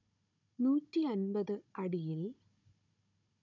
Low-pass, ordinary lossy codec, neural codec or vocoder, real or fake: 7.2 kHz; none; codec, 16 kHz, 16 kbps, FunCodec, trained on Chinese and English, 50 frames a second; fake